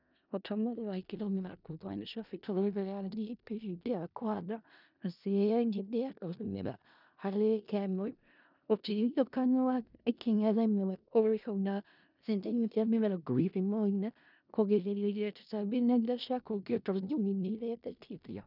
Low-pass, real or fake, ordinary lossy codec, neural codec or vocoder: 5.4 kHz; fake; none; codec, 16 kHz in and 24 kHz out, 0.4 kbps, LongCat-Audio-Codec, four codebook decoder